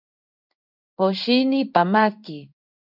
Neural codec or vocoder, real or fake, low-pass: codec, 16 kHz in and 24 kHz out, 1 kbps, XY-Tokenizer; fake; 5.4 kHz